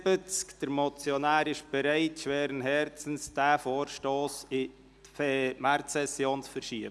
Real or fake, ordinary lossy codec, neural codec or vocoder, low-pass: real; none; none; none